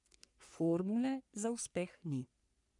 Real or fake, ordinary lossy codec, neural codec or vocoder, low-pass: fake; none; codec, 44.1 kHz, 3.4 kbps, Pupu-Codec; 10.8 kHz